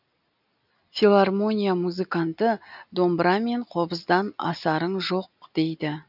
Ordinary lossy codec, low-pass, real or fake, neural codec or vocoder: none; 5.4 kHz; real; none